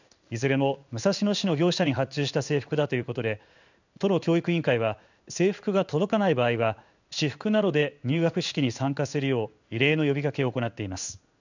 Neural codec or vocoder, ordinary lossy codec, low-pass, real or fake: codec, 16 kHz in and 24 kHz out, 1 kbps, XY-Tokenizer; none; 7.2 kHz; fake